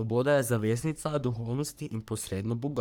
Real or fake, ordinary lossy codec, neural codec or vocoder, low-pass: fake; none; codec, 44.1 kHz, 3.4 kbps, Pupu-Codec; none